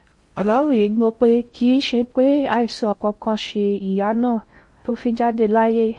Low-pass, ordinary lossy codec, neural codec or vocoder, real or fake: 10.8 kHz; MP3, 48 kbps; codec, 16 kHz in and 24 kHz out, 0.6 kbps, FocalCodec, streaming, 4096 codes; fake